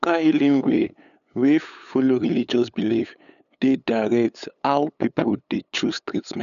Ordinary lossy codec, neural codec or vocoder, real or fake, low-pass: none; codec, 16 kHz, 8 kbps, FunCodec, trained on LibriTTS, 25 frames a second; fake; 7.2 kHz